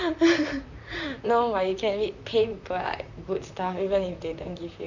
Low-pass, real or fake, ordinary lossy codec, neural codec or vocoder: 7.2 kHz; fake; none; vocoder, 44.1 kHz, 128 mel bands, Pupu-Vocoder